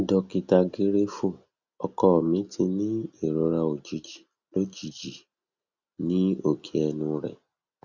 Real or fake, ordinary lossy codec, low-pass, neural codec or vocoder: real; Opus, 64 kbps; 7.2 kHz; none